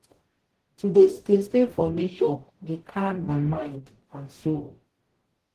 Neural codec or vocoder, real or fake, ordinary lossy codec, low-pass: codec, 44.1 kHz, 0.9 kbps, DAC; fake; Opus, 16 kbps; 14.4 kHz